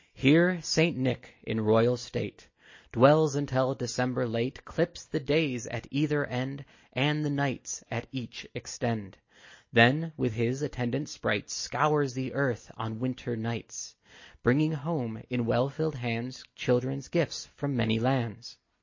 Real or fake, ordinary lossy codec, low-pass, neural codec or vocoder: real; MP3, 32 kbps; 7.2 kHz; none